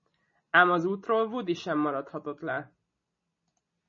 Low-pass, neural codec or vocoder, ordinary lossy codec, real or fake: 7.2 kHz; none; MP3, 32 kbps; real